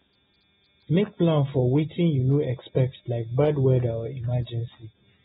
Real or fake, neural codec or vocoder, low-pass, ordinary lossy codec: real; none; 14.4 kHz; AAC, 16 kbps